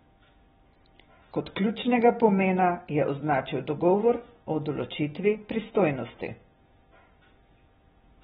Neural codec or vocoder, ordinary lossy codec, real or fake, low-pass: none; AAC, 16 kbps; real; 19.8 kHz